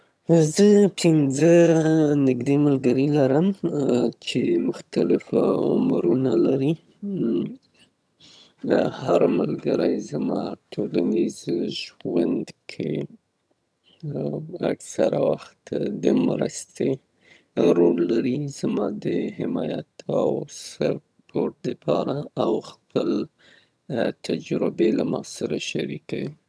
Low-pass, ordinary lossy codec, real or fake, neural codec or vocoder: none; none; fake; vocoder, 22.05 kHz, 80 mel bands, HiFi-GAN